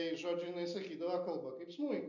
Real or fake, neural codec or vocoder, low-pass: real; none; 7.2 kHz